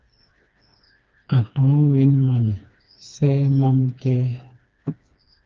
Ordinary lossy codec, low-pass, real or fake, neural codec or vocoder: Opus, 24 kbps; 7.2 kHz; fake; codec, 16 kHz, 2 kbps, FreqCodec, smaller model